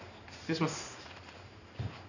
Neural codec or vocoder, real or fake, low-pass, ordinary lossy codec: codec, 16 kHz in and 24 kHz out, 1 kbps, XY-Tokenizer; fake; 7.2 kHz; none